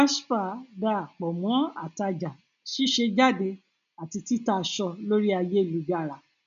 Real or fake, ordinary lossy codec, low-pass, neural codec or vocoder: real; none; 7.2 kHz; none